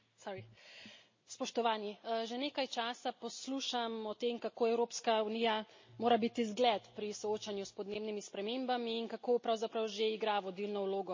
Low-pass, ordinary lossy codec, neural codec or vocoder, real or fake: 7.2 kHz; MP3, 32 kbps; none; real